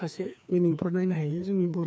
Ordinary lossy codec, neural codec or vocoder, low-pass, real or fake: none; codec, 16 kHz, 2 kbps, FreqCodec, larger model; none; fake